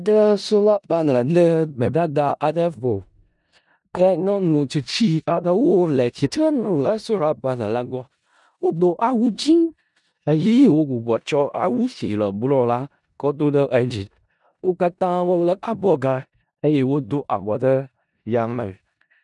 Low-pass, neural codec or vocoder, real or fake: 10.8 kHz; codec, 16 kHz in and 24 kHz out, 0.4 kbps, LongCat-Audio-Codec, four codebook decoder; fake